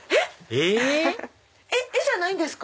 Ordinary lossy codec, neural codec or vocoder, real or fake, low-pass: none; none; real; none